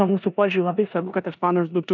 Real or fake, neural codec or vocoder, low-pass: fake; codec, 16 kHz in and 24 kHz out, 0.9 kbps, LongCat-Audio-Codec, four codebook decoder; 7.2 kHz